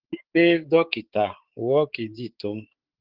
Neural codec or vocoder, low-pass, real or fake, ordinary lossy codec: codec, 16 kHz, 4 kbps, X-Codec, HuBERT features, trained on balanced general audio; 5.4 kHz; fake; Opus, 16 kbps